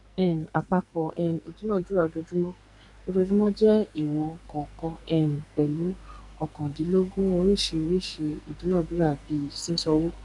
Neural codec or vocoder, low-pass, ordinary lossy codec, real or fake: codec, 44.1 kHz, 2.6 kbps, SNAC; 10.8 kHz; none; fake